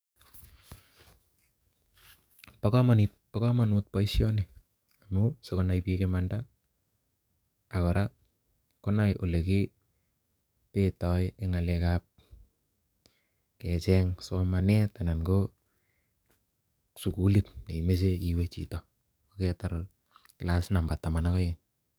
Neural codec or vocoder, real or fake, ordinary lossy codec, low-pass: codec, 44.1 kHz, 7.8 kbps, DAC; fake; none; none